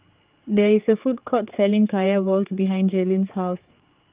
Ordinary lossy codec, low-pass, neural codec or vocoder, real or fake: Opus, 24 kbps; 3.6 kHz; codec, 16 kHz, 4 kbps, X-Codec, HuBERT features, trained on general audio; fake